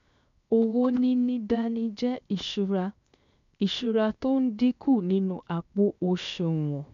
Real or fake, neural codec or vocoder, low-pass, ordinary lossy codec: fake; codec, 16 kHz, 0.7 kbps, FocalCodec; 7.2 kHz; none